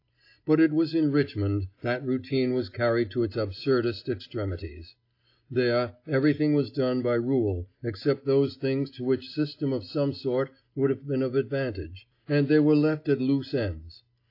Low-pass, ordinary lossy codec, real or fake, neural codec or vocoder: 5.4 kHz; AAC, 32 kbps; real; none